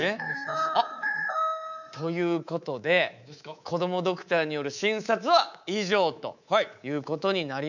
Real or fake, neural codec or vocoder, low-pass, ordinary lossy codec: fake; codec, 24 kHz, 3.1 kbps, DualCodec; 7.2 kHz; none